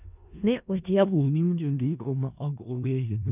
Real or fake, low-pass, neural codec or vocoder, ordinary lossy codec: fake; 3.6 kHz; codec, 16 kHz in and 24 kHz out, 0.4 kbps, LongCat-Audio-Codec, four codebook decoder; none